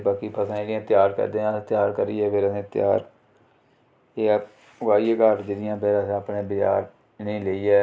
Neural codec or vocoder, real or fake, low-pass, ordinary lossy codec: none; real; none; none